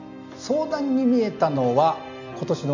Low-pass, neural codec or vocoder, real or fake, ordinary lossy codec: 7.2 kHz; none; real; none